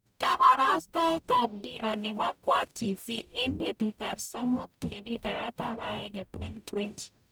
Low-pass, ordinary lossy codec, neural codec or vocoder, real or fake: none; none; codec, 44.1 kHz, 0.9 kbps, DAC; fake